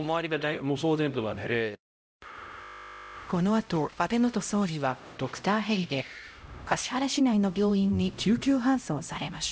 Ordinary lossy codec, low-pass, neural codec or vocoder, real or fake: none; none; codec, 16 kHz, 0.5 kbps, X-Codec, HuBERT features, trained on LibriSpeech; fake